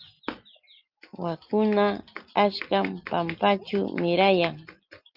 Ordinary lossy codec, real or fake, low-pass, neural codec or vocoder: Opus, 24 kbps; real; 5.4 kHz; none